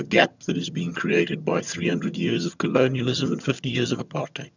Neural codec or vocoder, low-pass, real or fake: vocoder, 22.05 kHz, 80 mel bands, HiFi-GAN; 7.2 kHz; fake